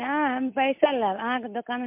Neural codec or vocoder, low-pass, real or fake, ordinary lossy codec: none; 3.6 kHz; real; MP3, 32 kbps